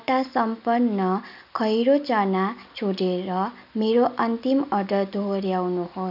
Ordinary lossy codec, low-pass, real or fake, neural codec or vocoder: none; 5.4 kHz; real; none